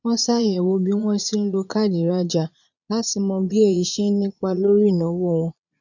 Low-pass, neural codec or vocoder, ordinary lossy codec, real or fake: 7.2 kHz; vocoder, 22.05 kHz, 80 mel bands, Vocos; none; fake